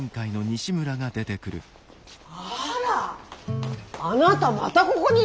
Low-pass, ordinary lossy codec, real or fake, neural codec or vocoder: none; none; real; none